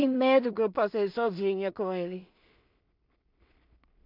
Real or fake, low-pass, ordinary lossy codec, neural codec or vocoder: fake; 5.4 kHz; AAC, 48 kbps; codec, 16 kHz in and 24 kHz out, 0.4 kbps, LongCat-Audio-Codec, two codebook decoder